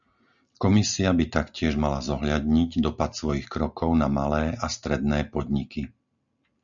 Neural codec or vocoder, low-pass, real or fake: none; 7.2 kHz; real